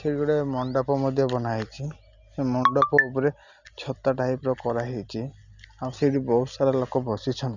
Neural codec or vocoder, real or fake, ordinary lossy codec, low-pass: none; real; none; 7.2 kHz